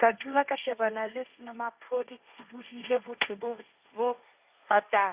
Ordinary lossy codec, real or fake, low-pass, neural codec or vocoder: Opus, 64 kbps; fake; 3.6 kHz; codec, 16 kHz, 1.1 kbps, Voila-Tokenizer